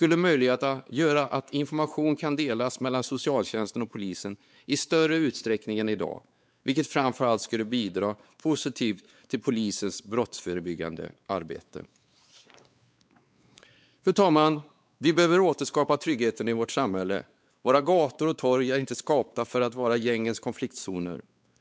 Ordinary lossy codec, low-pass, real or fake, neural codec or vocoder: none; none; fake; codec, 16 kHz, 4 kbps, X-Codec, WavLM features, trained on Multilingual LibriSpeech